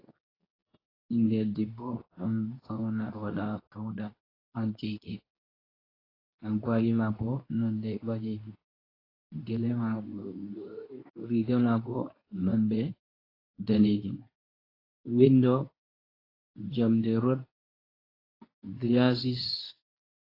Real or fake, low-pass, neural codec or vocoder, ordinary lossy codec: fake; 5.4 kHz; codec, 24 kHz, 0.9 kbps, WavTokenizer, medium speech release version 2; AAC, 24 kbps